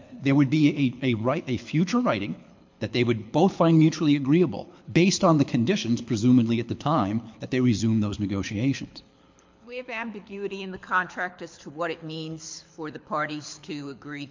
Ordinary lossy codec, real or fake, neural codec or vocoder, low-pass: MP3, 48 kbps; fake; codec, 24 kHz, 6 kbps, HILCodec; 7.2 kHz